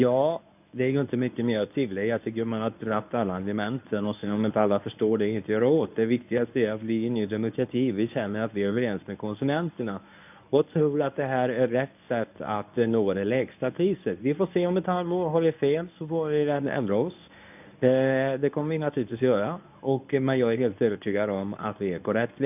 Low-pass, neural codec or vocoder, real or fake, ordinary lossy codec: 3.6 kHz; codec, 24 kHz, 0.9 kbps, WavTokenizer, medium speech release version 1; fake; none